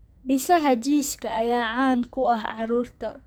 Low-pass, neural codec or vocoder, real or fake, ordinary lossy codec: none; codec, 44.1 kHz, 2.6 kbps, SNAC; fake; none